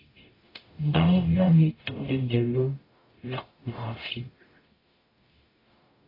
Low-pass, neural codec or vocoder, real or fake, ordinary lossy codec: 5.4 kHz; codec, 44.1 kHz, 0.9 kbps, DAC; fake; AAC, 24 kbps